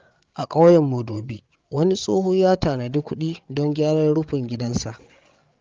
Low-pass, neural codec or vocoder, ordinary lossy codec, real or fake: 7.2 kHz; codec, 16 kHz, 8 kbps, FreqCodec, larger model; Opus, 32 kbps; fake